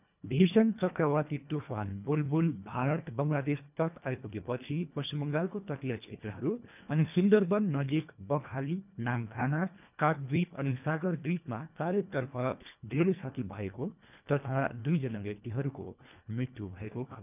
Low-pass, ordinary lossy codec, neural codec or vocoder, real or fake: 3.6 kHz; none; codec, 24 kHz, 1.5 kbps, HILCodec; fake